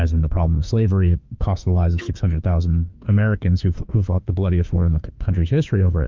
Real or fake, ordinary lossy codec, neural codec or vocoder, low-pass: fake; Opus, 24 kbps; autoencoder, 48 kHz, 32 numbers a frame, DAC-VAE, trained on Japanese speech; 7.2 kHz